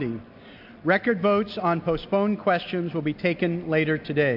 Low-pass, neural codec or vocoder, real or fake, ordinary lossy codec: 5.4 kHz; none; real; MP3, 48 kbps